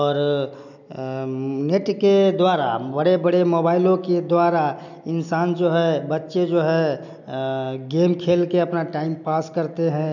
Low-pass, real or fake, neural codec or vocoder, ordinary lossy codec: 7.2 kHz; real; none; none